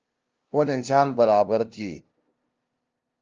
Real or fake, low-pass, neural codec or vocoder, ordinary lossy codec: fake; 7.2 kHz; codec, 16 kHz, 0.5 kbps, FunCodec, trained on LibriTTS, 25 frames a second; Opus, 24 kbps